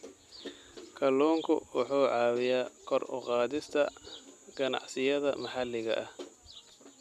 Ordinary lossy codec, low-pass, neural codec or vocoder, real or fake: none; 14.4 kHz; none; real